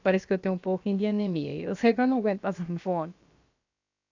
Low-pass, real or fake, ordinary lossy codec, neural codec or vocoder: 7.2 kHz; fake; AAC, 48 kbps; codec, 16 kHz, about 1 kbps, DyCAST, with the encoder's durations